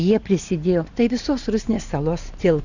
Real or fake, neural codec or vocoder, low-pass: real; none; 7.2 kHz